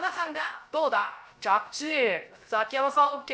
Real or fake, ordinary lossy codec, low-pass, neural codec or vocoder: fake; none; none; codec, 16 kHz, 0.3 kbps, FocalCodec